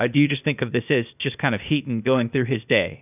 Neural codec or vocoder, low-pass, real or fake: codec, 16 kHz, 0.8 kbps, ZipCodec; 3.6 kHz; fake